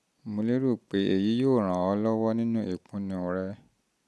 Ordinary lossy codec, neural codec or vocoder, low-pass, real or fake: none; none; none; real